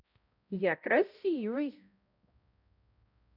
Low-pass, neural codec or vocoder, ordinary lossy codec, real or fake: 5.4 kHz; codec, 16 kHz, 0.5 kbps, X-Codec, HuBERT features, trained on balanced general audio; none; fake